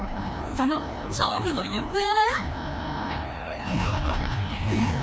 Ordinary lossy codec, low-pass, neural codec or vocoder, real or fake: none; none; codec, 16 kHz, 1 kbps, FreqCodec, larger model; fake